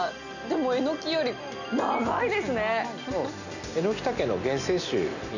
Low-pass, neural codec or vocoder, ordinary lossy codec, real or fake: 7.2 kHz; none; none; real